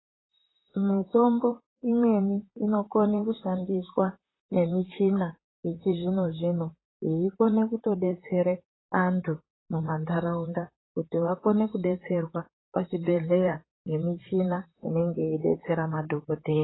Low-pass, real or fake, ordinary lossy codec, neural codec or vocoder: 7.2 kHz; fake; AAC, 16 kbps; vocoder, 44.1 kHz, 128 mel bands, Pupu-Vocoder